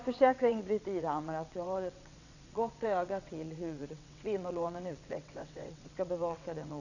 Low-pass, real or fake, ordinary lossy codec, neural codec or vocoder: 7.2 kHz; fake; none; vocoder, 22.05 kHz, 80 mel bands, WaveNeXt